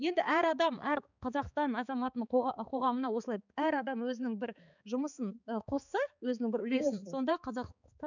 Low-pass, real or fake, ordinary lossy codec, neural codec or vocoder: 7.2 kHz; fake; none; codec, 16 kHz, 4 kbps, X-Codec, HuBERT features, trained on balanced general audio